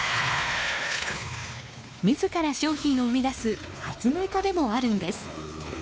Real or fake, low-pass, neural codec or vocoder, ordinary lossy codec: fake; none; codec, 16 kHz, 2 kbps, X-Codec, WavLM features, trained on Multilingual LibriSpeech; none